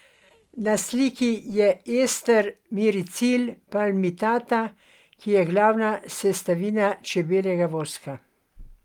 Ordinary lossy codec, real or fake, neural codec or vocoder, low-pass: Opus, 32 kbps; real; none; 19.8 kHz